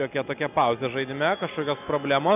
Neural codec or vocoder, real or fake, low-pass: none; real; 3.6 kHz